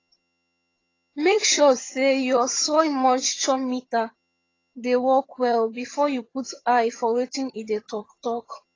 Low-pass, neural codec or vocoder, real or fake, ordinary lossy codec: 7.2 kHz; vocoder, 22.05 kHz, 80 mel bands, HiFi-GAN; fake; AAC, 32 kbps